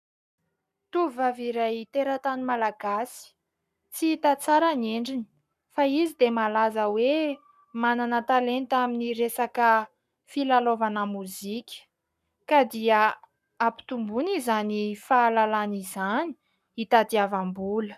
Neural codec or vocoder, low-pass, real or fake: codec, 44.1 kHz, 7.8 kbps, Pupu-Codec; 14.4 kHz; fake